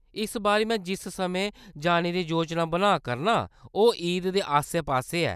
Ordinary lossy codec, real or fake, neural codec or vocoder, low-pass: none; real; none; 14.4 kHz